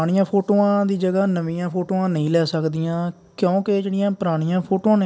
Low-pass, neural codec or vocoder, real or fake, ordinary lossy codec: none; none; real; none